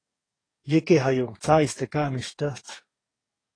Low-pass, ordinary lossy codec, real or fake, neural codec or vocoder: 9.9 kHz; AAC, 32 kbps; fake; codec, 44.1 kHz, 2.6 kbps, DAC